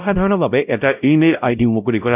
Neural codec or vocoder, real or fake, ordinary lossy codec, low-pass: codec, 16 kHz, 0.5 kbps, X-Codec, WavLM features, trained on Multilingual LibriSpeech; fake; none; 3.6 kHz